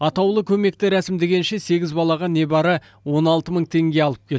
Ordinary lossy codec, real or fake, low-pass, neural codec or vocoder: none; real; none; none